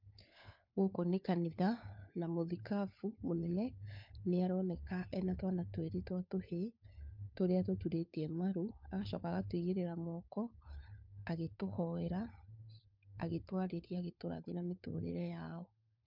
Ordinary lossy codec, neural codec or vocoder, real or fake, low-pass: MP3, 48 kbps; codec, 16 kHz, 4 kbps, FunCodec, trained on LibriTTS, 50 frames a second; fake; 5.4 kHz